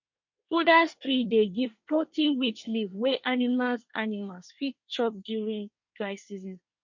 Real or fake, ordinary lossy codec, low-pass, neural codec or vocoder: fake; MP3, 48 kbps; 7.2 kHz; codec, 24 kHz, 1 kbps, SNAC